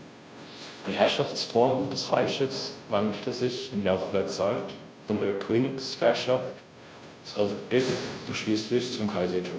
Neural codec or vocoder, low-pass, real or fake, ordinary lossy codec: codec, 16 kHz, 0.5 kbps, FunCodec, trained on Chinese and English, 25 frames a second; none; fake; none